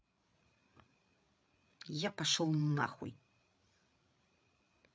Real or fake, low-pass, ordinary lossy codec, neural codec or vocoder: fake; none; none; codec, 16 kHz, 8 kbps, FreqCodec, larger model